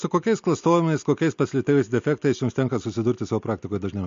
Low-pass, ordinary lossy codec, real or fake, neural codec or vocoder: 7.2 kHz; MP3, 48 kbps; real; none